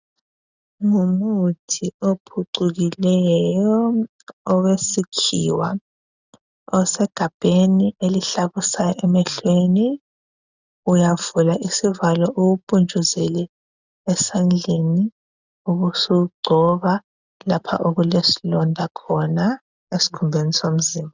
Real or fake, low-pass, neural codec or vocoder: real; 7.2 kHz; none